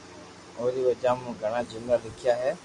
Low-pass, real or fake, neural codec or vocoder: 10.8 kHz; real; none